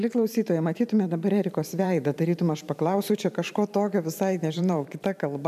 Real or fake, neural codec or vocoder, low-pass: fake; vocoder, 44.1 kHz, 128 mel bands every 512 samples, BigVGAN v2; 14.4 kHz